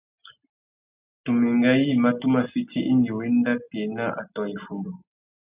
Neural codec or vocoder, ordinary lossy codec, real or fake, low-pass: none; Opus, 64 kbps; real; 3.6 kHz